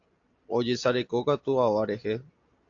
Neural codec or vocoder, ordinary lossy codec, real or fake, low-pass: none; AAC, 48 kbps; real; 7.2 kHz